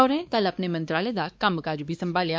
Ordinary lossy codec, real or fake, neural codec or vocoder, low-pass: none; fake; codec, 16 kHz, 2 kbps, X-Codec, WavLM features, trained on Multilingual LibriSpeech; none